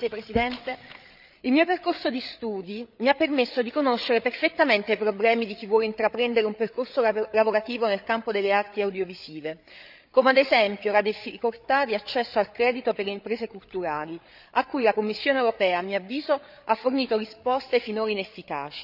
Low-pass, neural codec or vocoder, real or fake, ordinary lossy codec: 5.4 kHz; codec, 16 kHz, 8 kbps, FreqCodec, larger model; fake; none